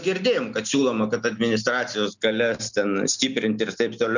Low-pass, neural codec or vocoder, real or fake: 7.2 kHz; none; real